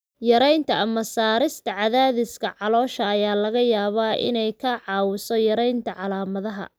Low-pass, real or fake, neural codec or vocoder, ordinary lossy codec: none; real; none; none